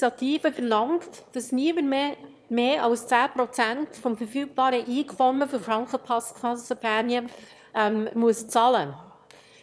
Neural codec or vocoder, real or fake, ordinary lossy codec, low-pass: autoencoder, 22.05 kHz, a latent of 192 numbers a frame, VITS, trained on one speaker; fake; none; none